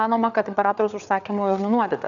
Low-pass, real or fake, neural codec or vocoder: 7.2 kHz; fake; codec, 16 kHz, 4 kbps, FreqCodec, larger model